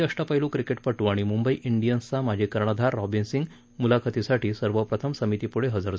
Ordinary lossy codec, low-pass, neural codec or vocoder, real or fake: none; none; none; real